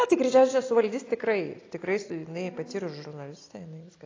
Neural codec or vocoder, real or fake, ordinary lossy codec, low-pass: none; real; AAC, 32 kbps; 7.2 kHz